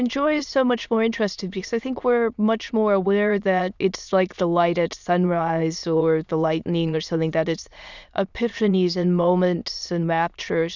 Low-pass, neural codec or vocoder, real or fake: 7.2 kHz; autoencoder, 22.05 kHz, a latent of 192 numbers a frame, VITS, trained on many speakers; fake